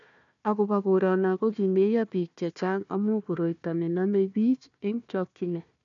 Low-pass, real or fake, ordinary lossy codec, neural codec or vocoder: 7.2 kHz; fake; none; codec, 16 kHz, 1 kbps, FunCodec, trained on Chinese and English, 50 frames a second